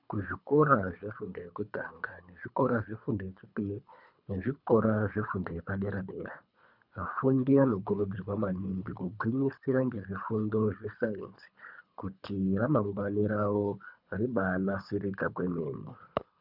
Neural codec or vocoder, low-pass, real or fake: codec, 24 kHz, 3 kbps, HILCodec; 5.4 kHz; fake